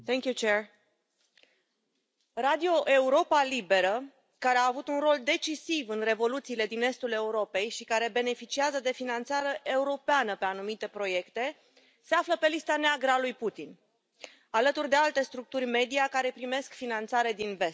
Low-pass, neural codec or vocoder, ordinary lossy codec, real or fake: none; none; none; real